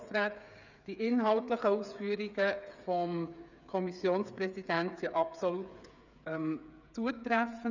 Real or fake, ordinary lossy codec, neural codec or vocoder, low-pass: fake; none; codec, 16 kHz, 8 kbps, FreqCodec, smaller model; 7.2 kHz